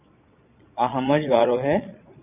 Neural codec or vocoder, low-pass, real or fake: vocoder, 22.05 kHz, 80 mel bands, Vocos; 3.6 kHz; fake